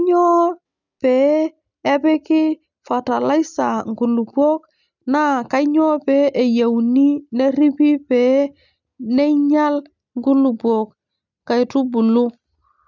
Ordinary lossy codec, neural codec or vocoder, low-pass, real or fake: none; none; 7.2 kHz; real